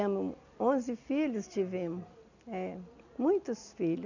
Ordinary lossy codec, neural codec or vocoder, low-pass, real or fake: none; none; 7.2 kHz; real